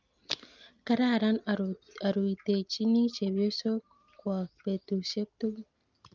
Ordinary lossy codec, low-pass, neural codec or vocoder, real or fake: Opus, 24 kbps; 7.2 kHz; none; real